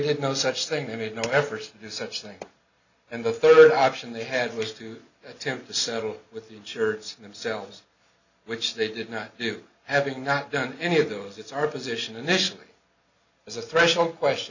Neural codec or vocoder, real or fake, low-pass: none; real; 7.2 kHz